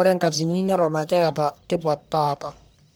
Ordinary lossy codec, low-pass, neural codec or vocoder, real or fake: none; none; codec, 44.1 kHz, 1.7 kbps, Pupu-Codec; fake